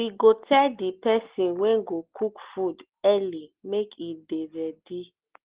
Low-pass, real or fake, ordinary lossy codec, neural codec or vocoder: 3.6 kHz; real; Opus, 16 kbps; none